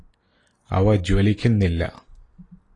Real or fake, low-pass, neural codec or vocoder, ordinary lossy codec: real; 10.8 kHz; none; AAC, 32 kbps